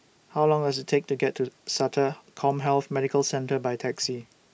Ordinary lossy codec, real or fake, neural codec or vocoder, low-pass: none; real; none; none